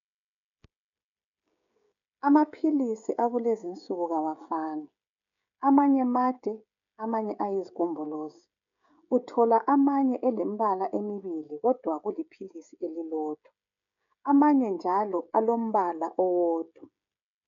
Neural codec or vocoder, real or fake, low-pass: codec, 16 kHz, 16 kbps, FreqCodec, smaller model; fake; 7.2 kHz